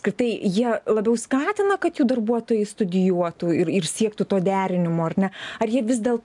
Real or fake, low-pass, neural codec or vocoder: real; 10.8 kHz; none